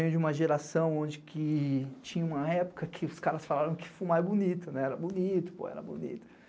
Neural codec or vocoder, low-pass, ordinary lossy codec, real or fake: none; none; none; real